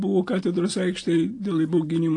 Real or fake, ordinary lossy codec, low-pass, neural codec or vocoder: real; AAC, 48 kbps; 10.8 kHz; none